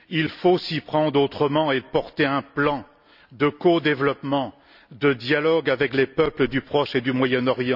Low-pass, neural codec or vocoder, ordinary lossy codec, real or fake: 5.4 kHz; none; none; real